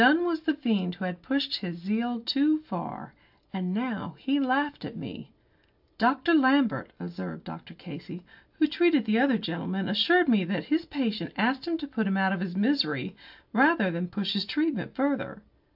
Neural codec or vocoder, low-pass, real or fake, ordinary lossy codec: none; 5.4 kHz; real; AAC, 48 kbps